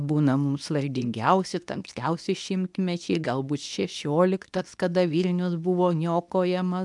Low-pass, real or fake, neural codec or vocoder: 10.8 kHz; fake; codec, 24 kHz, 0.9 kbps, WavTokenizer, medium speech release version 2